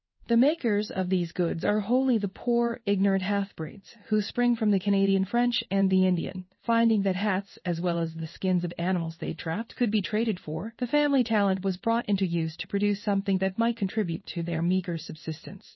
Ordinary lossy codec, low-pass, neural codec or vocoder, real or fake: MP3, 24 kbps; 7.2 kHz; codec, 16 kHz in and 24 kHz out, 1 kbps, XY-Tokenizer; fake